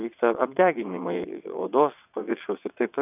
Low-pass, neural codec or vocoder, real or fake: 3.6 kHz; vocoder, 22.05 kHz, 80 mel bands, WaveNeXt; fake